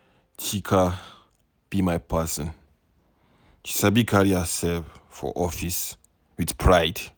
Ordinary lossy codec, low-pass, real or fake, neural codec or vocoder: none; none; real; none